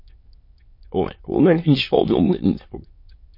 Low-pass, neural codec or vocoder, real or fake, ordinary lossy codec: 5.4 kHz; autoencoder, 22.05 kHz, a latent of 192 numbers a frame, VITS, trained on many speakers; fake; MP3, 32 kbps